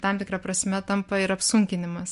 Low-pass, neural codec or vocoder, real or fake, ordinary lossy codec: 10.8 kHz; none; real; MP3, 64 kbps